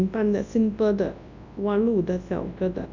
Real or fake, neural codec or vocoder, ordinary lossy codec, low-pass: fake; codec, 24 kHz, 0.9 kbps, WavTokenizer, large speech release; none; 7.2 kHz